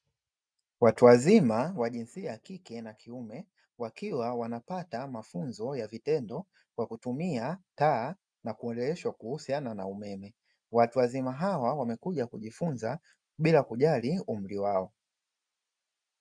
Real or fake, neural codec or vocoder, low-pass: real; none; 9.9 kHz